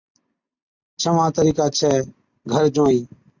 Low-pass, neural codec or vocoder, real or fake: 7.2 kHz; none; real